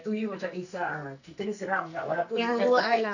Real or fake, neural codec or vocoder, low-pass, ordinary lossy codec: fake; codec, 44.1 kHz, 2.6 kbps, SNAC; 7.2 kHz; none